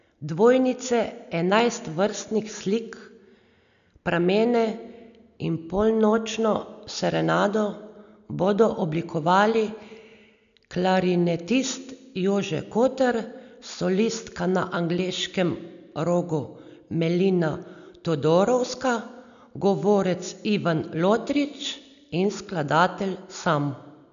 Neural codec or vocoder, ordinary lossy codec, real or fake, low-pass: none; none; real; 7.2 kHz